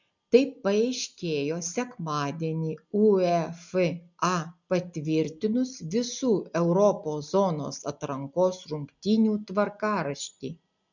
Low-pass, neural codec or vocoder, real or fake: 7.2 kHz; none; real